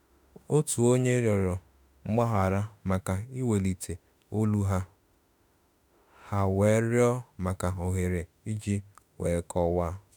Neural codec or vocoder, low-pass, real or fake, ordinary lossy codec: autoencoder, 48 kHz, 32 numbers a frame, DAC-VAE, trained on Japanese speech; none; fake; none